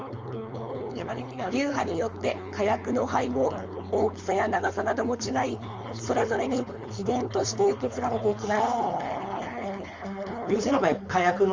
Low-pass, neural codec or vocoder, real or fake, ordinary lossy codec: 7.2 kHz; codec, 16 kHz, 4.8 kbps, FACodec; fake; Opus, 32 kbps